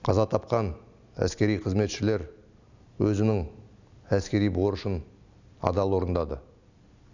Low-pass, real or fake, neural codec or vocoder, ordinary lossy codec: 7.2 kHz; real; none; none